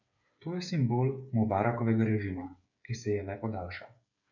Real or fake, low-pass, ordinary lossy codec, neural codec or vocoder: fake; 7.2 kHz; none; codec, 16 kHz, 8 kbps, FreqCodec, smaller model